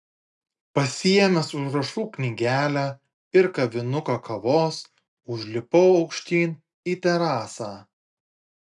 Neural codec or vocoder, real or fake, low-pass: none; real; 10.8 kHz